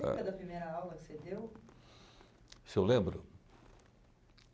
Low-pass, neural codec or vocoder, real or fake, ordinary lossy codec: none; none; real; none